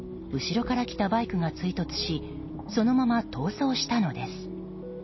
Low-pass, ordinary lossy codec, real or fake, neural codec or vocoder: 7.2 kHz; MP3, 24 kbps; real; none